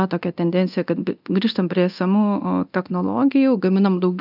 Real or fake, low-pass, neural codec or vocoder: fake; 5.4 kHz; codec, 24 kHz, 0.9 kbps, DualCodec